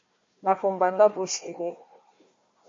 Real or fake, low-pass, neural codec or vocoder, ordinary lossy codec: fake; 7.2 kHz; codec, 16 kHz, 1 kbps, FunCodec, trained on Chinese and English, 50 frames a second; MP3, 32 kbps